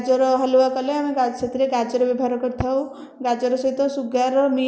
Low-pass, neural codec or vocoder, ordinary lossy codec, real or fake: none; none; none; real